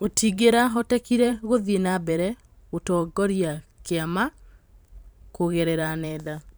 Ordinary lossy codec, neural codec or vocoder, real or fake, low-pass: none; vocoder, 44.1 kHz, 128 mel bands every 512 samples, BigVGAN v2; fake; none